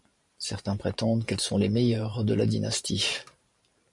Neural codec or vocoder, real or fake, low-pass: vocoder, 44.1 kHz, 128 mel bands every 512 samples, BigVGAN v2; fake; 10.8 kHz